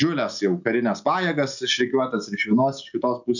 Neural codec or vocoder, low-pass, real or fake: none; 7.2 kHz; real